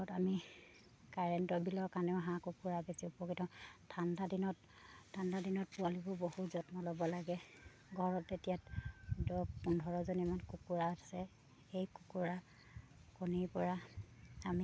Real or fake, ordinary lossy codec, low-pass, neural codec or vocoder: real; none; none; none